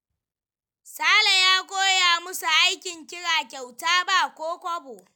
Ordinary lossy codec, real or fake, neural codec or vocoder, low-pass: none; real; none; none